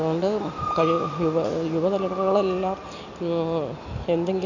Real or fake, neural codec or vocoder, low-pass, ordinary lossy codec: real; none; 7.2 kHz; none